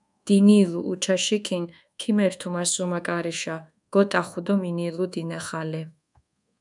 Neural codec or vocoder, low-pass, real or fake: codec, 24 kHz, 1.2 kbps, DualCodec; 10.8 kHz; fake